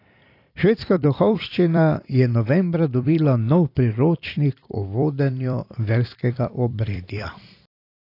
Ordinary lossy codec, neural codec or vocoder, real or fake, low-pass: AAC, 32 kbps; vocoder, 44.1 kHz, 128 mel bands every 256 samples, BigVGAN v2; fake; 5.4 kHz